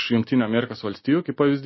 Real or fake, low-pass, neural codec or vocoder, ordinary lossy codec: real; 7.2 kHz; none; MP3, 24 kbps